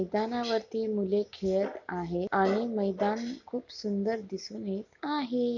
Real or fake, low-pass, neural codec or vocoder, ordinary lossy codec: real; 7.2 kHz; none; none